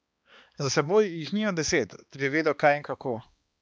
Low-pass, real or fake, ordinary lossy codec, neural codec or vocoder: none; fake; none; codec, 16 kHz, 2 kbps, X-Codec, HuBERT features, trained on balanced general audio